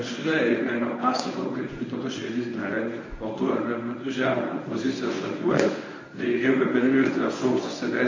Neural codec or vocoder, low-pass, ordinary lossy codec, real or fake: codec, 24 kHz, 0.9 kbps, WavTokenizer, medium speech release version 1; 7.2 kHz; MP3, 32 kbps; fake